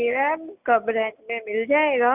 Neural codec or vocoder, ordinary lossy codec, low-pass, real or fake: none; Opus, 64 kbps; 3.6 kHz; real